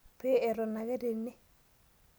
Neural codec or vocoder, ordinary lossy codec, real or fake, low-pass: none; none; real; none